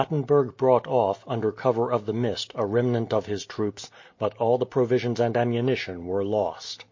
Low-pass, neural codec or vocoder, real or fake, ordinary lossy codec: 7.2 kHz; none; real; MP3, 32 kbps